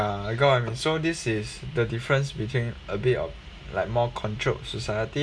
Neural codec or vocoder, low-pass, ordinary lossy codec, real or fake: none; none; none; real